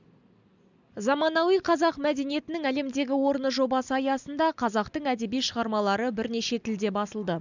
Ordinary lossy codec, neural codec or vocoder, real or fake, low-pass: none; none; real; 7.2 kHz